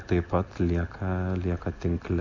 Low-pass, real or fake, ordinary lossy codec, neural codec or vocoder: 7.2 kHz; real; Opus, 64 kbps; none